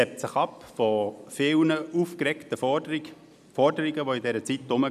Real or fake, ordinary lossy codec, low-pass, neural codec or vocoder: real; none; 14.4 kHz; none